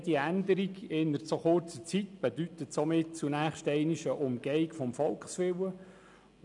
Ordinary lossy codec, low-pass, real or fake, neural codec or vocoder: none; 10.8 kHz; real; none